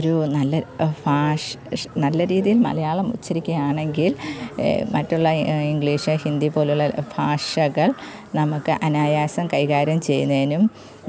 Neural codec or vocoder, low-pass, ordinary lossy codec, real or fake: none; none; none; real